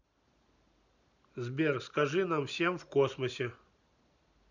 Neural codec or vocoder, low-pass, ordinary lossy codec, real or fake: none; 7.2 kHz; none; real